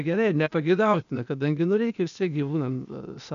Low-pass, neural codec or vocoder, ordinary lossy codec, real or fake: 7.2 kHz; codec, 16 kHz, 0.8 kbps, ZipCodec; MP3, 96 kbps; fake